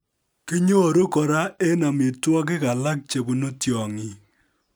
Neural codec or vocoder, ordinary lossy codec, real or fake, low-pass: none; none; real; none